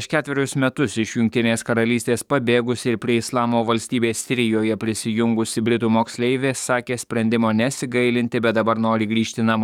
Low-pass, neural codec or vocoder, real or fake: 19.8 kHz; codec, 44.1 kHz, 7.8 kbps, DAC; fake